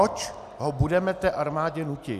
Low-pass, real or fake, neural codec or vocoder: 14.4 kHz; real; none